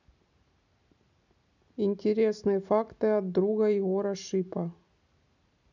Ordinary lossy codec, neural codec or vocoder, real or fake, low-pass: none; none; real; 7.2 kHz